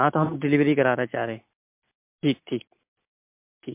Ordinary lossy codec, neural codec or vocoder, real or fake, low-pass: MP3, 32 kbps; none; real; 3.6 kHz